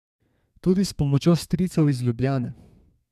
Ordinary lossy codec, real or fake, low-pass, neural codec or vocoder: MP3, 96 kbps; fake; 14.4 kHz; codec, 32 kHz, 1.9 kbps, SNAC